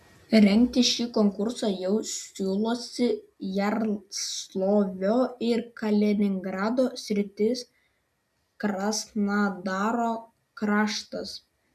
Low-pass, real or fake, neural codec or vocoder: 14.4 kHz; real; none